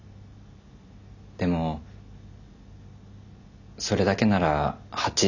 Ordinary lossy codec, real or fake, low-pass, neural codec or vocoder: none; real; 7.2 kHz; none